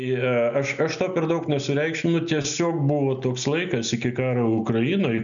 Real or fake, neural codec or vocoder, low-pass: real; none; 7.2 kHz